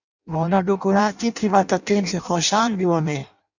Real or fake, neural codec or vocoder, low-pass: fake; codec, 16 kHz in and 24 kHz out, 0.6 kbps, FireRedTTS-2 codec; 7.2 kHz